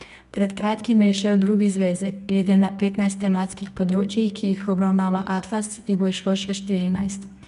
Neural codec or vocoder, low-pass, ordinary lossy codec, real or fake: codec, 24 kHz, 0.9 kbps, WavTokenizer, medium music audio release; 10.8 kHz; none; fake